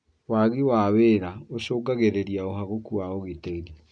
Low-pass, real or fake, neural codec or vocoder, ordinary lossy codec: 9.9 kHz; real; none; none